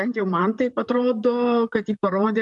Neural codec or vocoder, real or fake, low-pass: vocoder, 44.1 kHz, 128 mel bands, Pupu-Vocoder; fake; 10.8 kHz